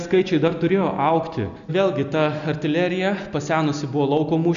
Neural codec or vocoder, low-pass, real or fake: none; 7.2 kHz; real